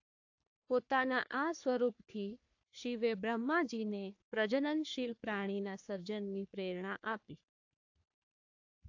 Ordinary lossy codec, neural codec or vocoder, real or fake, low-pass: AAC, 48 kbps; codec, 44.1 kHz, 3.4 kbps, Pupu-Codec; fake; 7.2 kHz